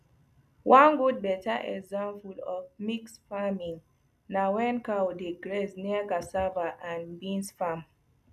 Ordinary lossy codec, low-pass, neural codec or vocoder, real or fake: none; 14.4 kHz; none; real